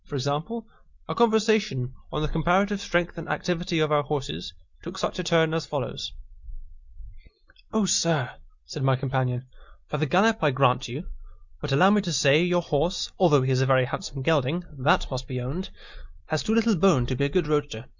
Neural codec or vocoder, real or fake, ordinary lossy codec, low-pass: none; real; Opus, 64 kbps; 7.2 kHz